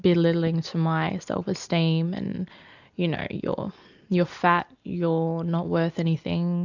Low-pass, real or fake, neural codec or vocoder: 7.2 kHz; real; none